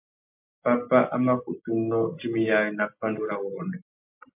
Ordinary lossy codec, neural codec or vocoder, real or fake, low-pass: MP3, 32 kbps; none; real; 3.6 kHz